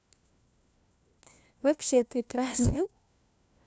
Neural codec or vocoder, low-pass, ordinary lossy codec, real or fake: codec, 16 kHz, 1 kbps, FunCodec, trained on LibriTTS, 50 frames a second; none; none; fake